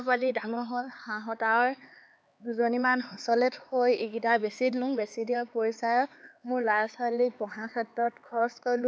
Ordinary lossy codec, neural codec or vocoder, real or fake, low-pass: none; codec, 16 kHz, 4 kbps, X-Codec, HuBERT features, trained on LibriSpeech; fake; none